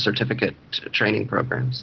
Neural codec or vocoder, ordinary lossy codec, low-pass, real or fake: none; Opus, 16 kbps; 7.2 kHz; real